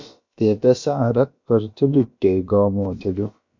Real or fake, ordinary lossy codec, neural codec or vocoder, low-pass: fake; MP3, 48 kbps; codec, 16 kHz, about 1 kbps, DyCAST, with the encoder's durations; 7.2 kHz